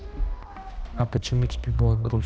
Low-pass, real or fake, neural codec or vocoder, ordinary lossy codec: none; fake; codec, 16 kHz, 1 kbps, X-Codec, HuBERT features, trained on balanced general audio; none